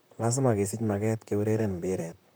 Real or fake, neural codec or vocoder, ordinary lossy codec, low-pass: fake; vocoder, 44.1 kHz, 128 mel bands, Pupu-Vocoder; none; none